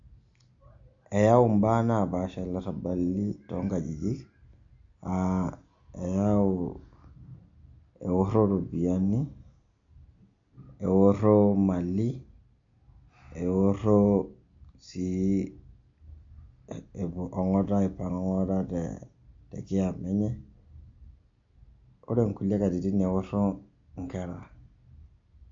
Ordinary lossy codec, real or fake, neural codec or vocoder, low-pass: MP3, 48 kbps; real; none; 7.2 kHz